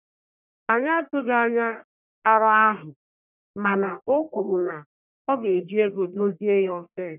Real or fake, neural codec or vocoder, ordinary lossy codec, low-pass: fake; codec, 44.1 kHz, 1.7 kbps, Pupu-Codec; none; 3.6 kHz